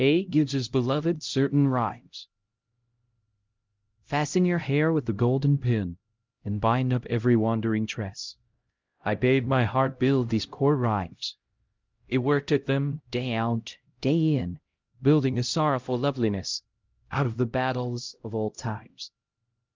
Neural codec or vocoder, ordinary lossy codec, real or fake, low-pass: codec, 16 kHz, 0.5 kbps, X-Codec, HuBERT features, trained on LibriSpeech; Opus, 32 kbps; fake; 7.2 kHz